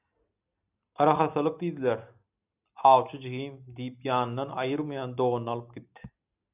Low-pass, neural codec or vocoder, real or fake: 3.6 kHz; none; real